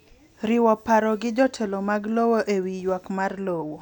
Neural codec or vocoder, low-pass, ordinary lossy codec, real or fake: none; 19.8 kHz; none; real